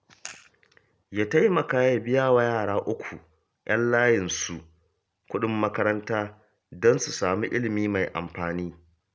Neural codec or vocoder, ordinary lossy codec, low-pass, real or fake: none; none; none; real